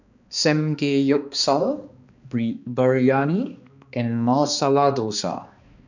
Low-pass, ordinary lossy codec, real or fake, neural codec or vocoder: 7.2 kHz; none; fake; codec, 16 kHz, 2 kbps, X-Codec, HuBERT features, trained on balanced general audio